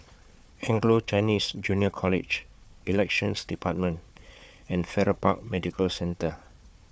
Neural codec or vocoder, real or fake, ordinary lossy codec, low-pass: codec, 16 kHz, 4 kbps, FunCodec, trained on Chinese and English, 50 frames a second; fake; none; none